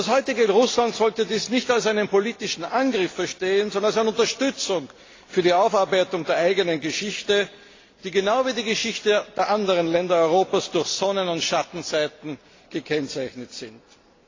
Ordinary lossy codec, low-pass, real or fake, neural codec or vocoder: AAC, 32 kbps; 7.2 kHz; real; none